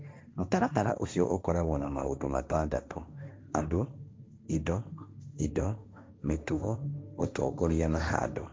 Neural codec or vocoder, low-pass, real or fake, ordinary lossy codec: codec, 16 kHz, 1.1 kbps, Voila-Tokenizer; none; fake; none